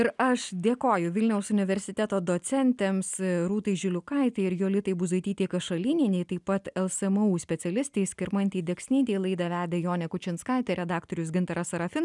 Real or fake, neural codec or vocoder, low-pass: real; none; 10.8 kHz